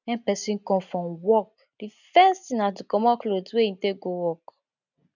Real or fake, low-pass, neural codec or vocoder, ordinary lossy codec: real; 7.2 kHz; none; none